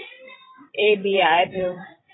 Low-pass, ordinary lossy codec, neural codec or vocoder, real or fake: 7.2 kHz; AAC, 16 kbps; codec, 16 kHz, 16 kbps, FreqCodec, larger model; fake